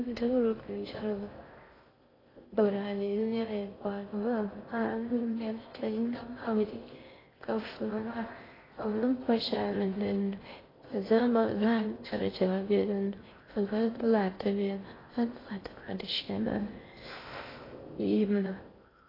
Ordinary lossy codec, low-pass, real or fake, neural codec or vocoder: AAC, 24 kbps; 5.4 kHz; fake; codec, 16 kHz in and 24 kHz out, 0.6 kbps, FocalCodec, streaming, 4096 codes